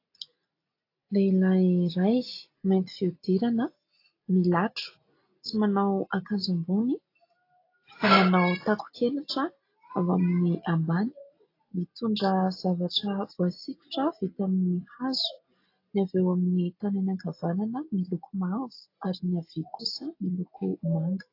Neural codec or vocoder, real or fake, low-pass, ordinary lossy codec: none; real; 5.4 kHz; AAC, 32 kbps